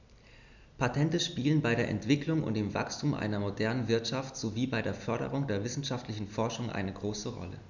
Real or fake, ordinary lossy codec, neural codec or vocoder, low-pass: real; none; none; 7.2 kHz